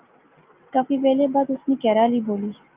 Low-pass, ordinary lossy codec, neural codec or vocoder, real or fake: 3.6 kHz; Opus, 32 kbps; none; real